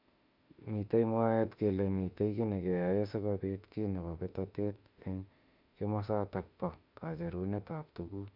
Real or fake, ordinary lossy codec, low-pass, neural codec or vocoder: fake; none; 5.4 kHz; autoencoder, 48 kHz, 32 numbers a frame, DAC-VAE, trained on Japanese speech